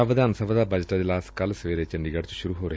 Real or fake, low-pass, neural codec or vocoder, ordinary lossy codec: real; none; none; none